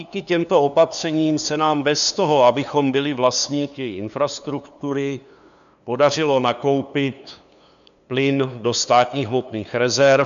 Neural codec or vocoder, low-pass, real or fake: codec, 16 kHz, 2 kbps, FunCodec, trained on LibriTTS, 25 frames a second; 7.2 kHz; fake